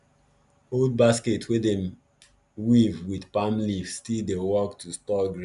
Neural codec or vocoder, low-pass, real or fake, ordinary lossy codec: none; 10.8 kHz; real; none